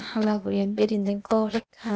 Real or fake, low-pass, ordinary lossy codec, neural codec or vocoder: fake; none; none; codec, 16 kHz, 0.8 kbps, ZipCodec